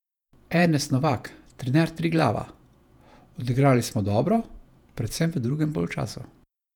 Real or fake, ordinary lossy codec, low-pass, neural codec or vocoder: fake; none; 19.8 kHz; vocoder, 48 kHz, 128 mel bands, Vocos